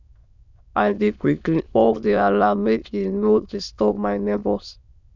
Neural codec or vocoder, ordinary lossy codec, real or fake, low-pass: autoencoder, 22.05 kHz, a latent of 192 numbers a frame, VITS, trained on many speakers; none; fake; 7.2 kHz